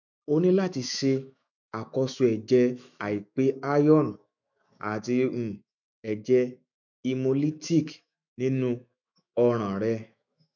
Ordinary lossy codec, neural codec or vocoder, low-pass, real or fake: none; autoencoder, 48 kHz, 128 numbers a frame, DAC-VAE, trained on Japanese speech; 7.2 kHz; fake